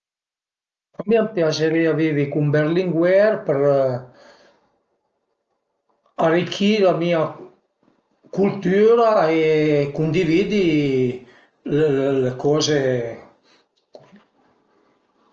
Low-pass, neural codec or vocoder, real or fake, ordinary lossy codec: 7.2 kHz; none; real; Opus, 24 kbps